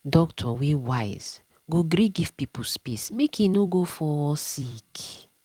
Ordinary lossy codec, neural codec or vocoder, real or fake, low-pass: Opus, 32 kbps; vocoder, 44.1 kHz, 128 mel bands every 256 samples, BigVGAN v2; fake; 19.8 kHz